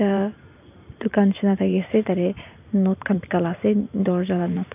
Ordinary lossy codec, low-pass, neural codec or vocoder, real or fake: none; 3.6 kHz; vocoder, 44.1 kHz, 128 mel bands every 512 samples, BigVGAN v2; fake